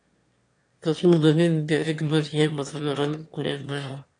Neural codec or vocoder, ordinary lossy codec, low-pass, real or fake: autoencoder, 22.05 kHz, a latent of 192 numbers a frame, VITS, trained on one speaker; AAC, 48 kbps; 9.9 kHz; fake